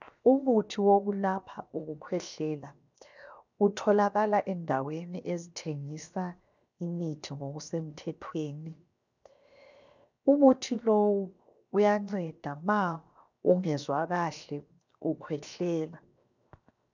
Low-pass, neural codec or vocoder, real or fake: 7.2 kHz; codec, 16 kHz, 0.7 kbps, FocalCodec; fake